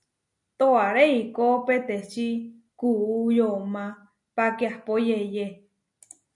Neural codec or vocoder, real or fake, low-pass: none; real; 10.8 kHz